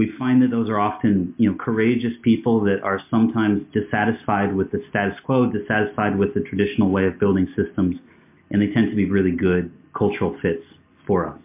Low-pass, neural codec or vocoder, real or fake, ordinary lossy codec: 3.6 kHz; none; real; MP3, 32 kbps